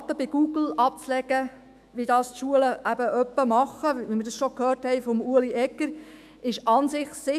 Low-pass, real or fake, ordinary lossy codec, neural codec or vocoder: 14.4 kHz; fake; AAC, 96 kbps; autoencoder, 48 kHz, 128 numbers a frame, DAC-VAE, trained on Japanese speech